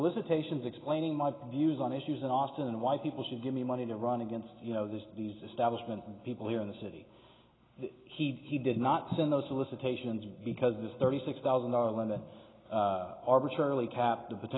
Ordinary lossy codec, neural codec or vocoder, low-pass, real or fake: AAC, 16 kbps; none; 7.2 kHz; real